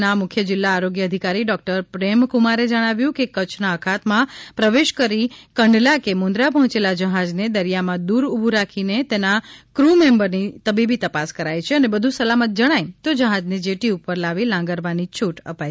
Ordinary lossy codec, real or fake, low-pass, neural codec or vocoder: none; real; none; none